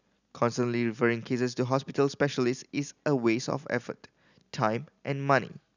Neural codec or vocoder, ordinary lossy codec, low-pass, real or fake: none; none; 7.2 kHz; real